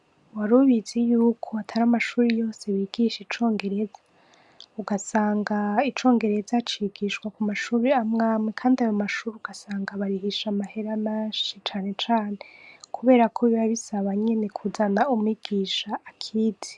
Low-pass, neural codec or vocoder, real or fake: 10.8 kHz; none; real